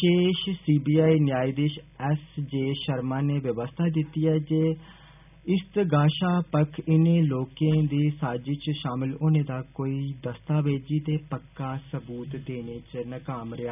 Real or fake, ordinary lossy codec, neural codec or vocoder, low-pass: real; none; none; 3.6 kHz